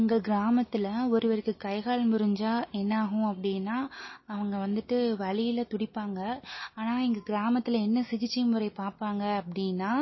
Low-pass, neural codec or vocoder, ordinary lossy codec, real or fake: 7.2 kHz; none; MP3, 24 kbps; real